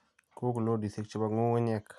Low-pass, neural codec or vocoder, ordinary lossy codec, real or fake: none; none; none; real